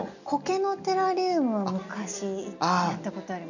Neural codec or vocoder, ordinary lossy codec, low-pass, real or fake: none; none; 7.2 kHz; real